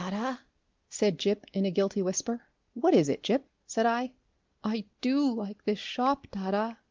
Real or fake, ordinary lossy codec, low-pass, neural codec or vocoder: real; Opus, 32 kbps; 7.2 kHz; none